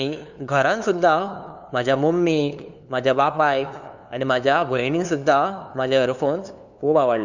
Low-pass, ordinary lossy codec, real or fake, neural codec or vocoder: 7.2 kHz; none; fake; codec, 16 kHz, 2 kbps, FunCodec, trained on LibriTTS, 25 frames a second